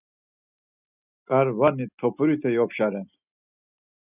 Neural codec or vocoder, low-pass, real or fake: none; 3.6 kHz; real